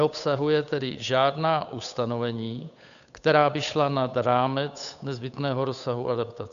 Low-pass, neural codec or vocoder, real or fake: 7.2 kHz; codec, 16 kHz, 8 kbps, FunCodec, trained on Chinese and English, 25 frames a second; fake